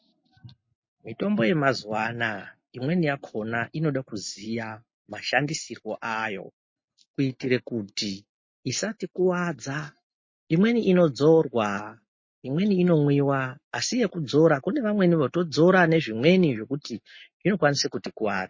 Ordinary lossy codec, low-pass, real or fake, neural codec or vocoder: MP3, 32 kbps; 7.2 kHz; real; none